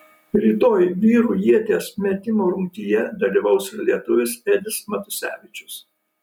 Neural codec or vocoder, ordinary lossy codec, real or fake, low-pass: none; MP3, 96 kbps; real; 19.8 kHz